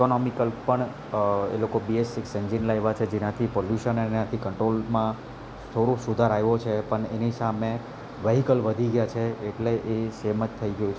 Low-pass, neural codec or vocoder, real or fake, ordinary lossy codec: none; none; real; none